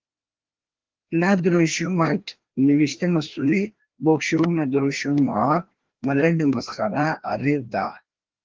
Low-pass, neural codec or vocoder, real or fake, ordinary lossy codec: 7.2 kHz; codec, 16 kHz, 1 kbps, FreqCodec, larger model; fake; Opus, 16 kbps